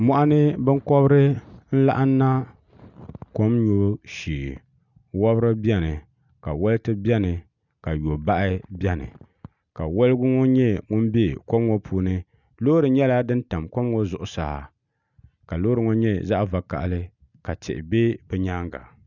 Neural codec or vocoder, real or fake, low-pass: none; real; 7.2 kHz